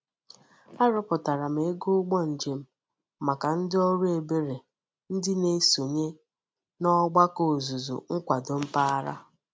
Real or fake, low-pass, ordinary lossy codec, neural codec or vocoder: real; none; none; none